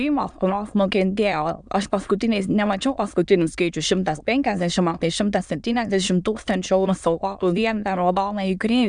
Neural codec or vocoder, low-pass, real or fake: autoencoder, 22.05 kHz, a latent of 192 numbers a frame, VITS, trained on many speakers; 9.9 kHz; fake